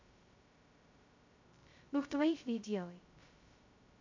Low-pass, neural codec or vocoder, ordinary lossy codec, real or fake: 7.2 kHz; codec, 16 kHz, 0.2 kbps, FocalCodec; MP3, 64 kbps; fake